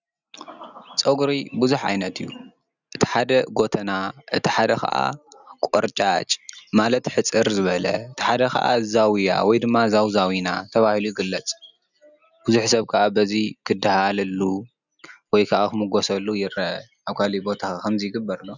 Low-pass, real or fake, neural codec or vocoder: 7.2 kHz; real; none